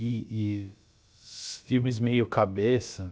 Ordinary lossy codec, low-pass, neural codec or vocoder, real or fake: none; none; codec, 16 kHz, about 1 kbps, DyCAST, with the encoder's durations; fake